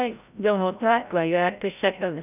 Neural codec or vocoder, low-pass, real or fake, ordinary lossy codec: codec, 16 kHz, 0.5 kbps, FreqCodec, larger model; 3.6 kHz; fake; none